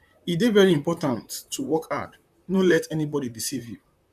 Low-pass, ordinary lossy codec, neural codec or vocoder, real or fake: 14.4 kHz; none; vocoder, 44.1 kHz, 128 mel bands, Pupu-Vocoder; fake